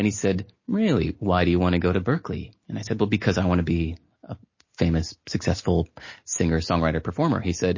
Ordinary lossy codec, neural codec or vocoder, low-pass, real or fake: MP3, 32 kbps; none; 7.2 kHz; real